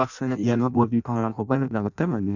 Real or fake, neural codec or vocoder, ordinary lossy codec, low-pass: fake; codec, 16 kHz in and 24 kHz out, 0.6 kbps, FireRedTTS-2 codec; none; 7.2 kHz